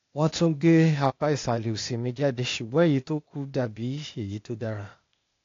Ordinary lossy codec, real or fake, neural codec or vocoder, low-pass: AAC, 48 kbps; fake; codec, 16 kHz, 0.8 kbps, ZipCodec; 7.2 kHz